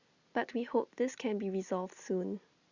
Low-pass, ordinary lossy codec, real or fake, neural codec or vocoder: 7.2 kHz; Opus, 64 kbps; real; none